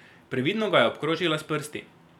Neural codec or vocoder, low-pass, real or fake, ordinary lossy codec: vocoder, 44.1 kHz, 128 mel bands every 256 samples, BigVGAN v2; 19.8 kHz; fake; none